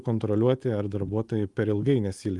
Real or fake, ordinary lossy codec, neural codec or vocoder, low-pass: real; Opus, 24 kbps; none; 10.8 kHz